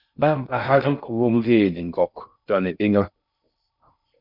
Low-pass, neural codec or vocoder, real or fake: 5.4 kHz; codec, 16 kHz in and 24 kHz out, 0.6 kbps, FocalCodec, streaming, 2048 codes; fake